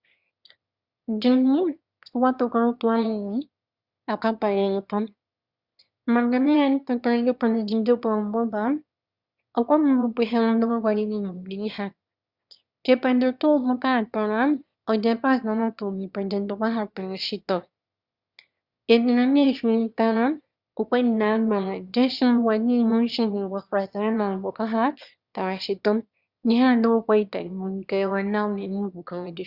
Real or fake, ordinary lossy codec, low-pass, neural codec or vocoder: fake; Opus, 64 kbps; 5.4 kHz; autoencoder, 22.05 kHz, a latent of 192 numbers a frame, VITS, trained on one speaker